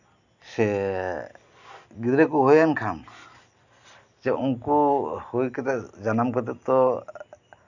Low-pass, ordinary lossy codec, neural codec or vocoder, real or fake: 7.2 kHz; none; none; real